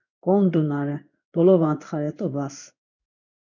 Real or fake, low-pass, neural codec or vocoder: fake; 7.2 kHz; codec, 16 kHz in and 24 kHz out, 1 kbps, XY-Tokenizer